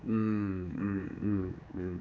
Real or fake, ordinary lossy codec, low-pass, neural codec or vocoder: fake; none; none; codec, 16 kHz, 4 kbps, X-Codec, HuBERT features, trained on general audio